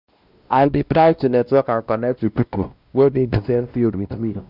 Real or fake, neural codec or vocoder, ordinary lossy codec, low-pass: fake; codec, 16 kHz, 1 kbps, X-Codec, WavLM features, trained on Multilingual LibriSpeech; none; 5.4 kHz